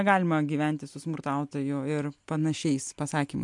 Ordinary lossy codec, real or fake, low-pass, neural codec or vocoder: MP3, 64 kbps; real; 10.8 kHz; none